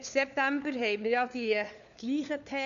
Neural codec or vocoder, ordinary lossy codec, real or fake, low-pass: codec, 16 kHz, 4 kbps, FunCodec, trained on LibriTTS, 50 frames a second; none; fake; 7.2 kHz